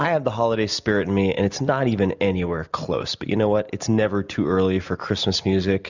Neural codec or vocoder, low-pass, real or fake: none; 7.2 kHz; real